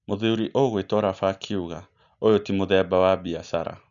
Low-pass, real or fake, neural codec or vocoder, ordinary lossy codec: 7.2 kHz; real; none; none